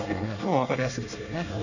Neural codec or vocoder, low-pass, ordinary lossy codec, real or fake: codec, 24 kHz, 1 kbps, SNAC; 7.2 kHz; none; fake